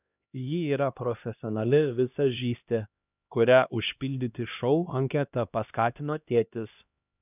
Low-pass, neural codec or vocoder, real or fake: 3.6 kHz; codec, 16 kHz, 1 kbps, X-Codec, HuBERT features, trained on LibriSpeech; fake